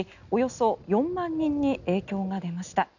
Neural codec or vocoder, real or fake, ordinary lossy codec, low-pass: none; real; none; 7.2 kHz